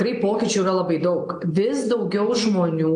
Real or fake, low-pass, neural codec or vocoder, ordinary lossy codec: real; 9.9 kHz; none; AAC, 64 kbps